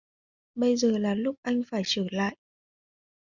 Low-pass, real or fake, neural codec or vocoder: 7.2 kHz; real; none